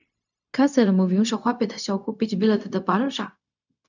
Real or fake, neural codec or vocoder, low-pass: fake; codec, 16 kHz, 0.4 kbps, LongCat-Audio-Codec; 7.2 kHz